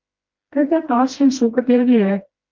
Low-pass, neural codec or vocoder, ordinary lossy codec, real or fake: 7.2 kHz; codec, 16 kHz, 1 kbps, FreqCodec, smaller model; Opus, 32 kbps; fake